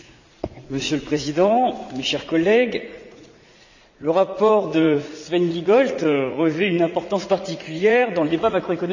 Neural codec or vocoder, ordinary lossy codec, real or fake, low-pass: vocoder, 44.1 kHz, 80 mel bands, Vocos; none; fake; 7.2 kHz